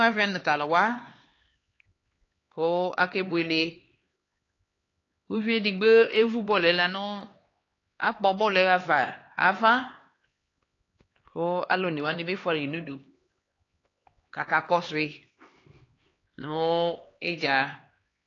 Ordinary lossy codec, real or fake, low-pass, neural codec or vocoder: AAC, 32 kbps; fake; 7.2 kHz; codec, 16 kHz, 2 kbps, X-Codec, HuBERT features, trained on LibriSpeech